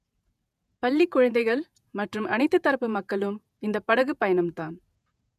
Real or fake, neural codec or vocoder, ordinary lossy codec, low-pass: fake; vocoder, 48 kHz, 128 mel bands, Vocos; none; 14.4 kHz